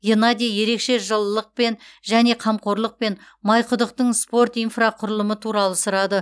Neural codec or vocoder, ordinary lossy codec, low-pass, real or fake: none; none; none; real